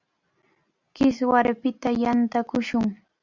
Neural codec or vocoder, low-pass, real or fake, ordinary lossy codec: none; 7.2 kHz; real; Opus, 64 kbps